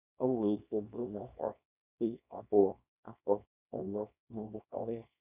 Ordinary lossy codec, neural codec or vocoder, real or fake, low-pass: none; codec, 24 kHz, 0.9 kbps, WavTokenizer, small release; fake; 3.6 kHz